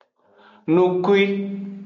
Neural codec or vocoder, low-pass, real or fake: none; 7.2 kHz; real